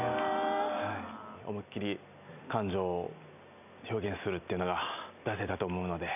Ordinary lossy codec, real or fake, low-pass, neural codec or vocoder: none; real; 3.6 kHz; none